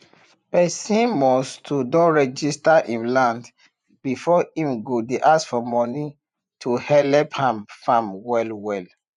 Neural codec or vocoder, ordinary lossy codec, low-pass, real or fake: vocoder, 24 kHz, 100 mel bands, Vocos; none; 10.8 kHz; fake